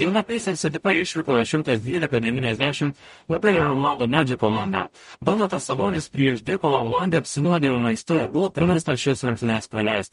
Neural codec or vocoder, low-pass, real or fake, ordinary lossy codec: codec, 44.1 kHz, 0.9 kbps, DAC; 19.8 kHz; fake; MP3, 48 kbps